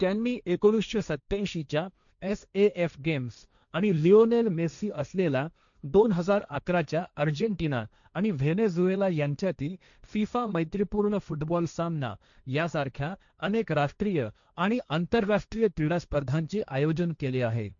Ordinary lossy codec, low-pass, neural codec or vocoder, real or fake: MP3, 96 kbps; 7.2 kHz; codec, 16 kHz, 1.1 kbps, Voila-Tokenizer; fake